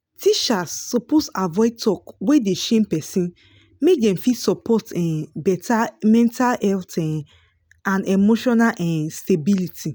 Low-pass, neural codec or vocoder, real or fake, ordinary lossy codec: none; none; real; none